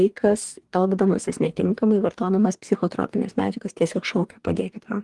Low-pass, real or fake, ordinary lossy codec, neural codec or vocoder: 10.8 kHz; fake; Opus, 24 kbps; codec, 44.1 kHz, 2.6 kbps, DAC